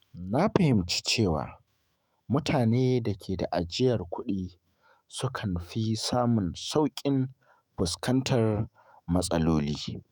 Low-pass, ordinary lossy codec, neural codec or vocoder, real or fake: none; none; autoencoder, 48 kHz, 128 numbers a frame, DAC-VAE, trained on Japanese speech; fake